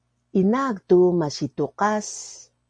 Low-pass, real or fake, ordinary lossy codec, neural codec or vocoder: 9.9 kHz; real; MP3, 48 kbps; none